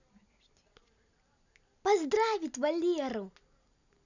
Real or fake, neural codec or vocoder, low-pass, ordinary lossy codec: real; none; 7.2 kHz; none